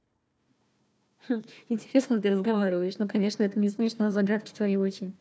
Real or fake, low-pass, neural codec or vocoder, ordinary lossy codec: fake; none; codec, 16 kHz, 1 kbps, FunCodec, trained on Chinese and English, 50 frames a second; none